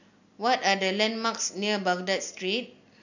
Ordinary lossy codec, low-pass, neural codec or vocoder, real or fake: none; 7.2 kHz; none; real